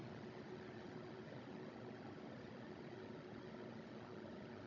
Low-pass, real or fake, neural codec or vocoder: 7.2 kHz; fake; codec, 16 kHz, 16 kbps, FunCodec, trained on Chinese and English, 50 frames a second